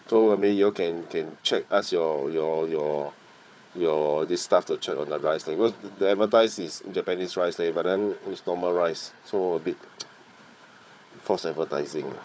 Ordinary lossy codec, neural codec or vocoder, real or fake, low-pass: none; codec, 16 kHz, 4 kbps, FunCodec, trained on Chinese and English, 50 frames a second; fake; none